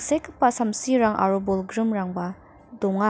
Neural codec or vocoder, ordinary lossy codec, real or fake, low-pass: none; none; real; none